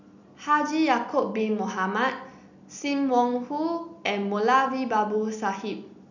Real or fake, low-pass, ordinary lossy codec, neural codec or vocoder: real; 7.2 kHz; none; none